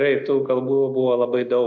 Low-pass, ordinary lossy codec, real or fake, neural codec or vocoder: 7.2 kHz; AAC, 48 kbps; real; none